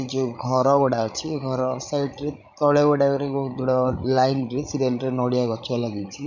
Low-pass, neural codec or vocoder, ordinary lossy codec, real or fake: 7.2 kHz; codec, 16 kHz, 16 kbps, FreqCodec, larger model; none; fake